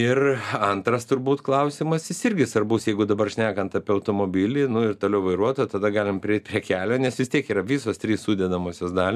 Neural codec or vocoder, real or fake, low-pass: none; real; 14.4 kHz